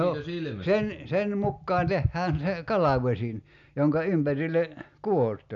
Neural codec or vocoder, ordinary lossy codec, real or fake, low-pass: none; none; real; 7.2 kHz